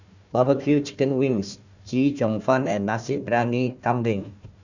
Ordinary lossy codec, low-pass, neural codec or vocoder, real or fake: none; 7.2 kHz; codec, 16 kHz, 1 kbps, FunCodec, trained on Chinese and English, 50 frames a second; fake